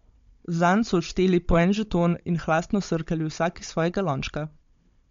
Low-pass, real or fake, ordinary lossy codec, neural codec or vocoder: 7.2 kHz; fake; MP3, 48 kbps; codec, 16 kHz, 16 kbps, FunCodec, trained on Chinese and English, 50 frames a second